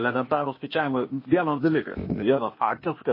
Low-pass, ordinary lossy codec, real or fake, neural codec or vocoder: 5.4 kHz; MP3, 24 kbps; fake; codec, 16 kHz, 0.8 kbps, ZipCodec